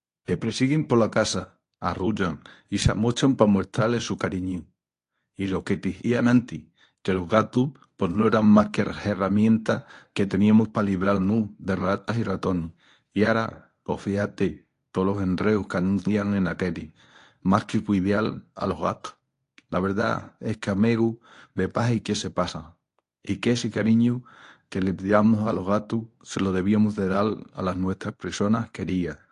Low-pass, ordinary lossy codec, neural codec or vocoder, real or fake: 10.8 kHz; AAC, 48 kbps; codec, 24 kHz, 0.9 kbps, WavTokenizer, medium speech release version 1; fake